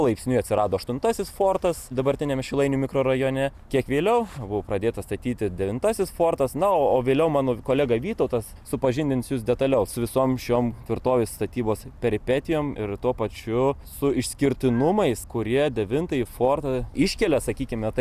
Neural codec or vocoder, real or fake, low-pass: none; real; 14.4 kHz